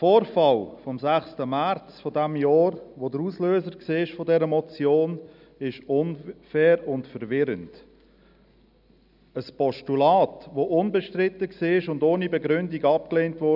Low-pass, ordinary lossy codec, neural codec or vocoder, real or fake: 5.4 kHz; none; none; real